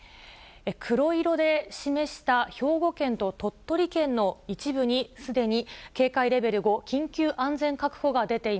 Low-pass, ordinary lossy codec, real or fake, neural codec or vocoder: none; none; real; none